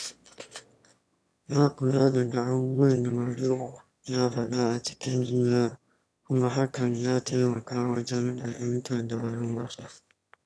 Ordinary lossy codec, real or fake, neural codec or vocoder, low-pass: none; fake; autoencoder, 22.05 kHz, a latent of 192 numbers a frame, VITS, trained on one speaker; none